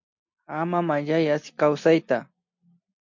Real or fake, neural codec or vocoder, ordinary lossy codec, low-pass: real; none; MP3, 48 kbps; 7.2 kHz